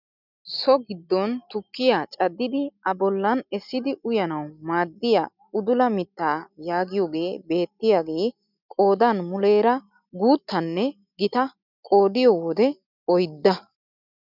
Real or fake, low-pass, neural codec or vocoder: real; 5.4 kHz; none